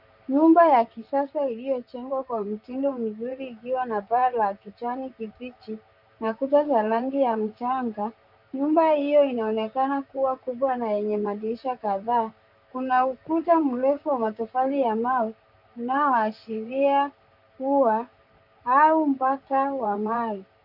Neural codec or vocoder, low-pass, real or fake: vocoder, 44.1 kHz, 128 mel bands, Pupu-Vocoder; 5.4 kHz; fake